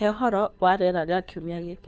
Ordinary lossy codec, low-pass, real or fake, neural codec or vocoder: none; none; fake; codec, 16 kHz, 2 kbps, FunCodec, trained on Chinese and English, 25 frames a second